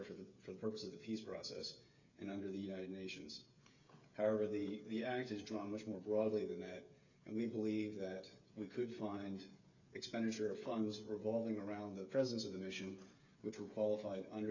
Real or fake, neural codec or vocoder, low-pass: fake; codec, 16 kHz, 8 kbps, FreqCodec, smaller model; 7.2 kHz